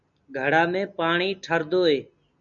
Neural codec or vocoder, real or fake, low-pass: none; real; 7.2 kHz